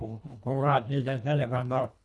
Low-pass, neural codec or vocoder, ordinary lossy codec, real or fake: none; codec, 24 kHz, 1.5 kbps, HILCodec; none; fake